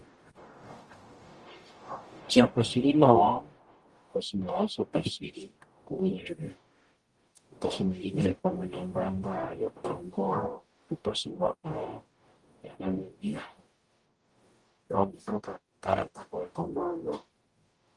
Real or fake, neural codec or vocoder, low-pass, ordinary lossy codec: fake; codec, 44.1 kHz, 0.9 kbps, DAC; 10.8 kHz; Opus, 24 kbps